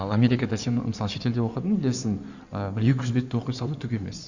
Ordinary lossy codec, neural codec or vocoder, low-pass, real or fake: Opus, 64 kbps; codec, 16 kHz in and 24 kHz out, 2.2 kbps, FireRedTTS-2 codec; 7.2 kHz; fake